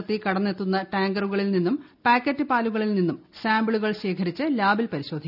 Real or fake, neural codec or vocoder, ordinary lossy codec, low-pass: real; none; none; 5.4 kHz